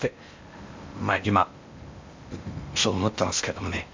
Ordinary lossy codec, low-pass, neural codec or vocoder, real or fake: MP3, 64 kbps; 7.2 kHz; codec, 16 kHz in and 24 kHz out, 0.8 kbps, FocalCodec, streaming, 65536 codes; fake